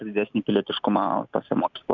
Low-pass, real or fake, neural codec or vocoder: 7.2 kHz; real; none